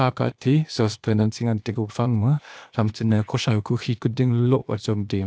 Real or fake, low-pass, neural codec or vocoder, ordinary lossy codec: fake; none; codec, 16 kHz, 0.8 kbps, ZipCodec; none